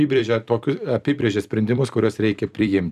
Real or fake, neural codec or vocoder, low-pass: fake; vocoder, 44.1 kHz, 128 mel bands every 256 samples, BigVGAN v2; 14.4 kHz